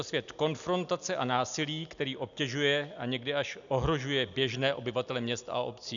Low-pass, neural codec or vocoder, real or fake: 7.2 kHz; none; real